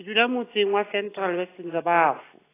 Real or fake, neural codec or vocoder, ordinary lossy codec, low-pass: real; none; AAC, 16 kbps; 3.6 kHz